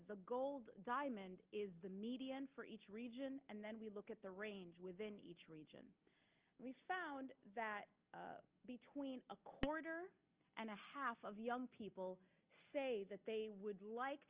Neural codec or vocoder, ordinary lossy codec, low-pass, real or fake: none; Opus, 24 kbps; 3.6 kHz; real